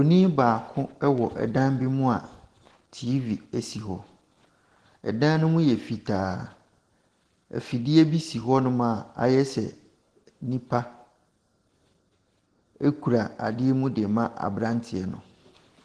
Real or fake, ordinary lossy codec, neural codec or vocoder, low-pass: real; Opus, 16 kbps; none; 10.8 kHz